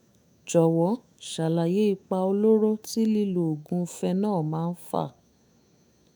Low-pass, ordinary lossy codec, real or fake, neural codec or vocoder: none; none; fake; autoencoder, 48 kHz, 128 numbers a frame, DAC-VAE, trained on Japanese speech